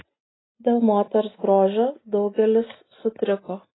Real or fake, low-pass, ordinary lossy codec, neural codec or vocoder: real; 7.2 kHz; AAC, 16 kbps; none